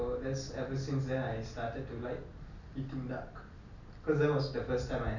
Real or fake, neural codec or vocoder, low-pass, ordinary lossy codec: real; none; 7.2 kHz; none